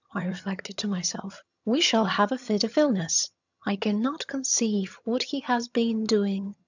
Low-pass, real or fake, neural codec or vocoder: 7.2 kHz; fake; vocoder, 22.05 kHz, 80 mel bands, HiFi-GAN